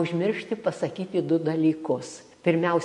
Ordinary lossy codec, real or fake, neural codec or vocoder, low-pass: MP3, 48 kbps; real; none; 10.8 kHz